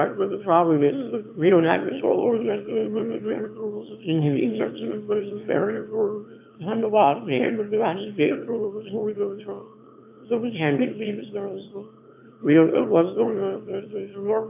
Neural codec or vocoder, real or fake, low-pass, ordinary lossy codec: autoencoder, 22.05 kHz, a latent of 192 numbers a frame, VITS, trained on one speaker; fake; 3.6 kHz; AAC, 32 kbps